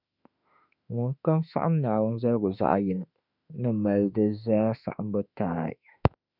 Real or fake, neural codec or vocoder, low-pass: fake; autoencoder, 48 kHz, 32 numbers a frame, DAC-VAE, trained on Japanese speech; 5.4 kHz